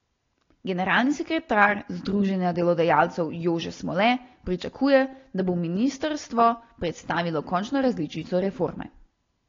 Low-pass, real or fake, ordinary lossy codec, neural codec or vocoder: 7.2 kHz; real; AAC, 32 kbps; none